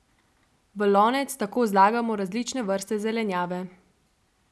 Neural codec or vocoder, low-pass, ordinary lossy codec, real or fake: none; none; none; real